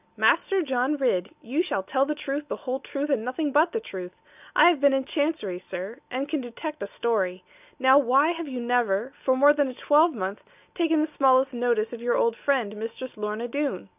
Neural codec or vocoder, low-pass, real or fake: none; 3.6 kHz; real